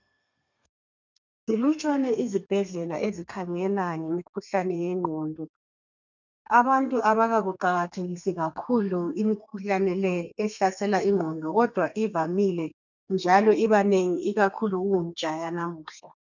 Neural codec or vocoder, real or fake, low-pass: codec, 32 kHz, 1.9 kbps, SNAC; fake; 7.2 kHz